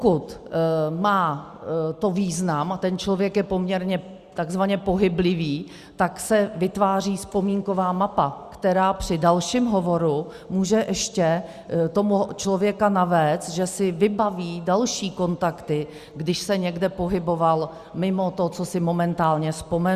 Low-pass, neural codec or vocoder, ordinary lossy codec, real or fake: 14.4 kHz; none; Opus, 64 kbps; real